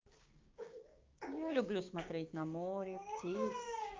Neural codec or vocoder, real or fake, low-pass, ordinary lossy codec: codec, 16 kHz, 6 kbps, DAC; fake; 7.2 kHz; Opus, 32 kbps